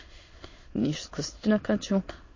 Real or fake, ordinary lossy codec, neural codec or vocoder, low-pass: fake; MP3, 32 kbps; autoencoder, 22.05 kHz, a latent of 192 numbers a frame, VITS, trained on many speakers; 7.2 kHz